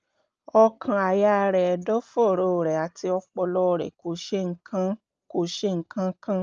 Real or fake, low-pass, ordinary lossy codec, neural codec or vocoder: real; 7.2 kHz; Opus, 24 kbps; none